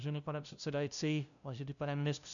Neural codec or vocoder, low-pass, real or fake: codec, 16 kHz, 0.5 kbps, FunCodec, trained on LibriTTS, 25 frames a second; 7.2 kHz; fake